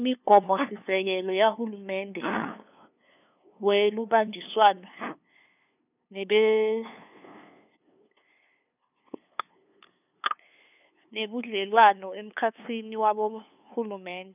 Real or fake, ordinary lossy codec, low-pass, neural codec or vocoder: fake; none; 3.6 kHz; codec, 16 kHz, 2 kbps, FunCodec, trained on LibriTTS, 25 frames a second